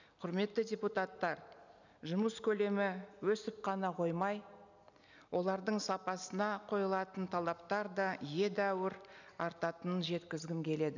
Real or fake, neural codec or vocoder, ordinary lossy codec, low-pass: real; none; none; 7.2 kHz